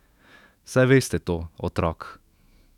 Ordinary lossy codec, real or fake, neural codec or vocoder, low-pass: none; fake; autoencoder, 48 kHz, 128 numbers a frame, DAC-VAE, trained on Japanese speech; 19.8 kHz